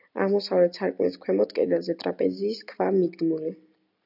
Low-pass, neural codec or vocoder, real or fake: 5.4 kHz; none; real